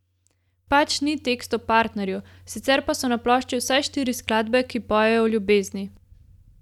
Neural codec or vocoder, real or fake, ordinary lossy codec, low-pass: none; real; none; 19.8 kHz